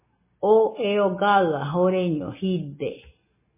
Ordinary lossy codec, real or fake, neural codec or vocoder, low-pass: MP3, 16 kbps; real; none; 3.6 kHz